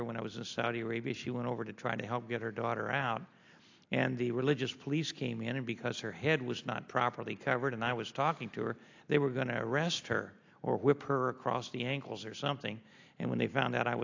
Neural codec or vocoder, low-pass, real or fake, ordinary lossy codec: none; 7.2 kHz; real; AAC, 48 kbps